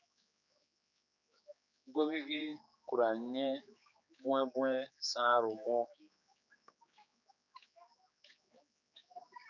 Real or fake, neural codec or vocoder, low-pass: fake; codec, 16 kHz, 4 kbps, X-Codec, HuBERT features, trained on general audio; 7.2 kHz